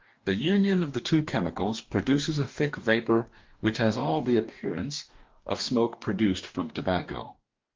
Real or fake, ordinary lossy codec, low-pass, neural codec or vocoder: fake; Opus, 32 kbps; 7.2 kHz; codec, 44.1 kHz, 2.6 kbps, DAC